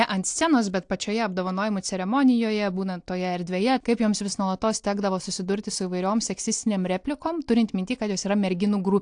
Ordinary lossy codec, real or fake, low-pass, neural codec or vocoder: AAC, 64 kbps; real; 9.9 kHz; none